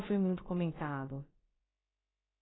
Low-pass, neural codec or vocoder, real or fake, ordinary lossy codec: 7.2 kHz; codec, 16 kHz, about 1 kbps, DyCAST, with the encoder's durations; fake; AAC, 16 kbps